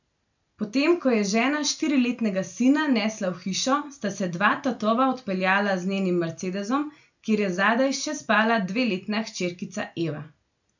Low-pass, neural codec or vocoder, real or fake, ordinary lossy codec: 7.2 kHz; none; real; none